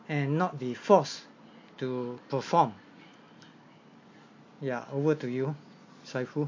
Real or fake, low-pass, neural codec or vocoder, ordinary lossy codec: real; 7.2 kHz; none; MP3, 48 kbps